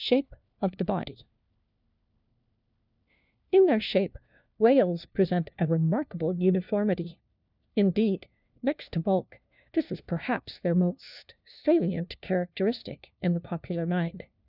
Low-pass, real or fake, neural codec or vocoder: 5.4 kHz; fake; codec, 16 kHz, 1 kbps, FunCodec, trained on LibriTTS, 50 frames a second